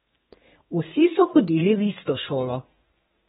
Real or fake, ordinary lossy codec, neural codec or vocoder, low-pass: fake; AAC, 16 kbps; codec, 32 kHz, 1.9 kbps, SNAC; 14.4 kHz